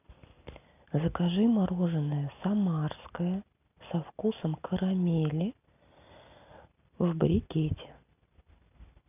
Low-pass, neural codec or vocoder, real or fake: 3.6 kHz; none; real